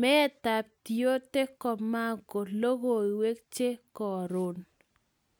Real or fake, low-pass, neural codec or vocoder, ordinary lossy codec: real; none; none; none